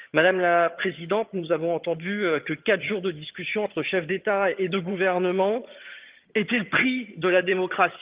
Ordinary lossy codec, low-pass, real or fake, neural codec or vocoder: Opus, 32 kbps; 3.6 kHz; fake; vocoder, 22.05 kHz, 80 mel bands, HiFi-GAN